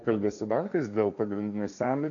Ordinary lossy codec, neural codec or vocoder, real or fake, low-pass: MP3, 96 kbps; codec, 16 kHz, 1.1 kbps, Voila-Tokenizer; fake; 7.2 kHz